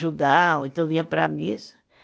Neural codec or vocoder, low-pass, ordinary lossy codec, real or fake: codec, 16 kHz, 0.8 kbps, ZipCodec; none; none; fake